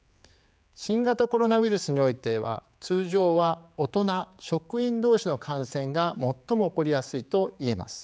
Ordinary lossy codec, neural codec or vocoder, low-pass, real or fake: none; codec, 16 kHz, 4 kbps, X-Codec, HuBERT features, trained on general audio; none; fake